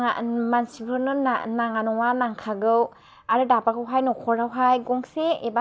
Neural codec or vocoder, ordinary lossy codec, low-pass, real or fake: none; none; none; real